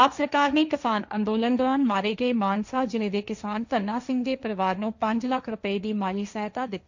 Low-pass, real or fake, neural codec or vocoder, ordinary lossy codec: 7.2 kHz; fake; codec, 16 kHz, 1.1 kbps, Voila-Tokenizer; none